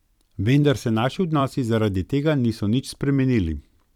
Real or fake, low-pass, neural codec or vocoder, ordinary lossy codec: fake; 19.8 kHz; vocoder, 44.1 kHz, 128 mel bands every 256 samples, BigVGAN v2; none